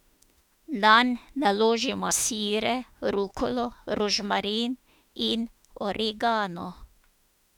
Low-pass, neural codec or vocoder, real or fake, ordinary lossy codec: 19.8 kHz; autoencoder, 48 kHz, 32 numbers a frame, DAC-VAE, trained on Japanese speech; fake; none